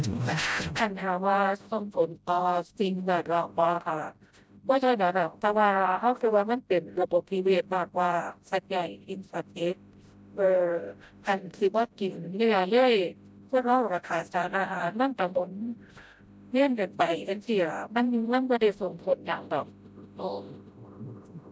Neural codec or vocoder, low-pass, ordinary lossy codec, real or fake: codec, 16 kHz, 0.5 kbps, FreqCodec, smaller model; none; none; fake